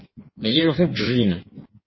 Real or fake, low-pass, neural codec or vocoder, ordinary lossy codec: fake; 7.2 kHz; codec, 16 kHz in and 24 kHz out, 1.1 kbps, FireRedTTS-2 codec; MP3, 24 kbps